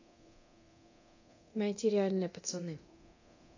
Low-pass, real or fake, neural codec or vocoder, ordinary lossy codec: 7.2 kHz; fake; codec, 24 kHz, 0.9 kbps, DualCodec; AAC, 48 kbps